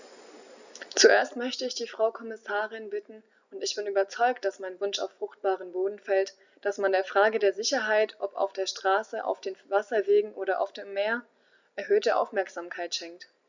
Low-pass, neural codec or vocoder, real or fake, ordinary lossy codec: 7.2 kHz; none; real; none